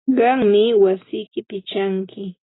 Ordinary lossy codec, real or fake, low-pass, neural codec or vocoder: AAC, 16 kbps; real; 7.2 kHz; none